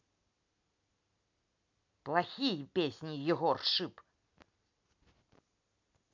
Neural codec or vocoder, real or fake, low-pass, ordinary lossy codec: none; real; 7.2 kHz; MP3, 64 kbps